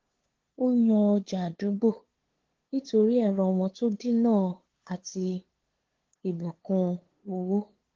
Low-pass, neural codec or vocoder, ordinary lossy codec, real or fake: 7.2 kHz; codec, 16 kHz, 2 kbps, FunCodec, trained on LibriTTS, 25 frames a second; Opus, 16 kbps; fake